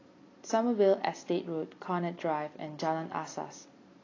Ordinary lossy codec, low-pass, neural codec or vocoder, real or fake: AAC, 32 kbps; 7.2 kHz; none; real